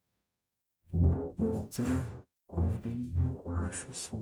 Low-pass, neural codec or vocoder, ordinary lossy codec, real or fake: none; codec, 44.1 kHz, 0.9 kbps, DAC; none; fake